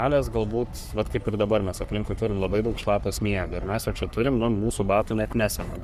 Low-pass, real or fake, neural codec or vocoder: 14.4 kHz; fake; codec, 44.1 kHz, 3.4 kbps, Pupu-Codec